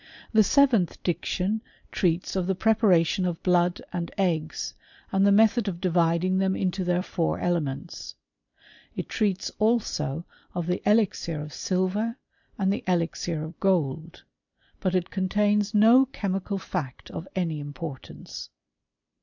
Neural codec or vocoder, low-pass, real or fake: vocoder, 44.1 kHz, 128 mel bands every 256 samples, BigVGAN v2; 7.2 kHz; fake